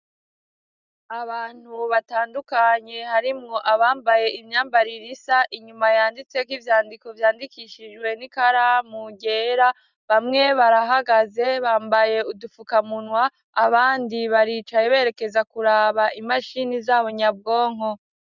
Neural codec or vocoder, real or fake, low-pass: none; real; 7.2 kHz